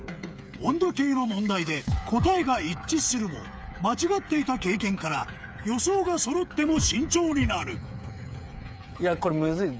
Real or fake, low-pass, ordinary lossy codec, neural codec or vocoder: fake; none; none; codec, 16 kHz, 8 kbps, FreqCodec, smaller model